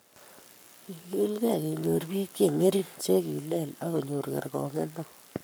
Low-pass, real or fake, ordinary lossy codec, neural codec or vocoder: none; fake; none; codec, 44.1 kHz, 7.8 kbps, Pupu-Codec